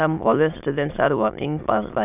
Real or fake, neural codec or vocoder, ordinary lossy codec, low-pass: fake; autoencoder, 22.05 kHz, a latent of 192 numbers a frame, VITS, trained on many speakers; none; 3.6 kHz